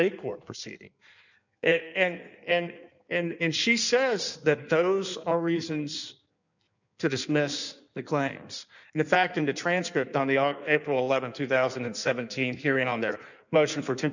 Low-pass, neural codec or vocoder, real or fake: 7.2 kHz; codec, 16 kHz in and 24 kHz out, 1.1 kbps, FireRedTTS-2 codec; fake